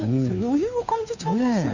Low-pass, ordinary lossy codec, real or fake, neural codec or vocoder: 7.2 kHz; none; fake; codec, 16 kHz, 2 kbps, FunCodec, trained on Chinese and English, 25 frames a second